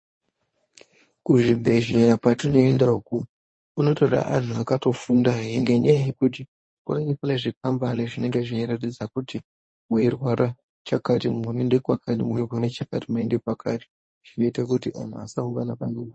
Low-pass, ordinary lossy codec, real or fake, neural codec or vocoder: 9.9 kHz; MP3, 32 kbps; fake; codec, 24 kHz, 0.9 kbps, WavTokenizer, medium speech release version 1